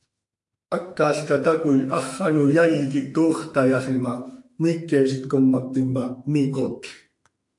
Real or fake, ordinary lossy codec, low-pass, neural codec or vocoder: fake; AAC, 64 kbps; 10.8 kHz; autoencoder, 48 kHz, 32 numbers a frame, DAC-VAE, trained on Japanese speech